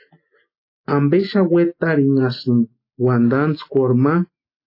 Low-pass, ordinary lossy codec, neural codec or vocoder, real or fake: 5.4 kHz; AAC, 32 kbps; none; real